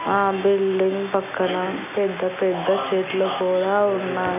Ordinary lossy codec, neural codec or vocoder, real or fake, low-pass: AAC, 32 kbps; none; real; 3.6 kHz